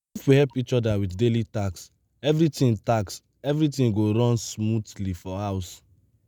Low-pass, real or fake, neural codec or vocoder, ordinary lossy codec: none; real; none; none